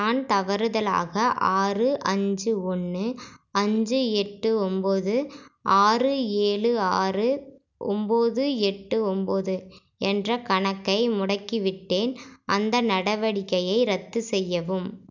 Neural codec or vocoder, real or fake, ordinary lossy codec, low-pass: none; real; none; 7.2 kHz